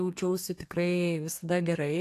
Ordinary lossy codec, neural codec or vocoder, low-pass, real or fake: AAC, 64 kbps; codec, 32 kHz, 1.9 kbps, SNAC; 14.4 kHz; fake